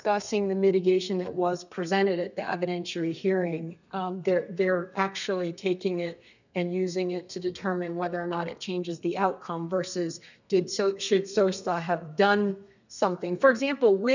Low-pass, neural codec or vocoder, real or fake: 7.2 kHz; codec, 32 kHz, 1.9 kbps, SNAC; fake